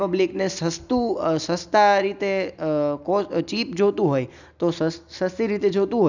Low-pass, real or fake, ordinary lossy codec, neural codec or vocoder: 7.2 kHz; real; none; none